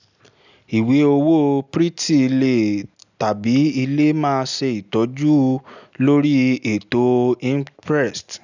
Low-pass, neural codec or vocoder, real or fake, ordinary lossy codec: 7.2 kHz; none; real; none